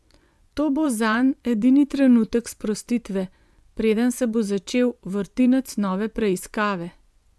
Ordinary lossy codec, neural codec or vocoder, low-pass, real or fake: none; vocoder, 24 kHz, 100 mel bands, Vocos; none; fake